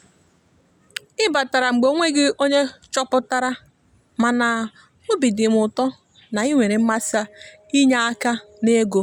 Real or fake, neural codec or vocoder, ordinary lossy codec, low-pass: real; none; none; 19.8 kHz